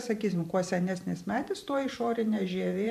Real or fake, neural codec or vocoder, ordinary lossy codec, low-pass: fake; vocoder, 44.1 kHz, 128 mel bands every 512 samples, BigVGAN v2; AAC, 96 kbps; 14.4 kHz